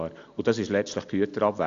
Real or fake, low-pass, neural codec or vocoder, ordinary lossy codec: real; 7.2 kHz; none; AAC, 64 kbps